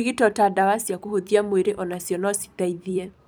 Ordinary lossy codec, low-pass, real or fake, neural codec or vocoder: none; none; fake; vocoder, 44.1 kHz, 128 mel bands every 256 samples, BigVGAN v2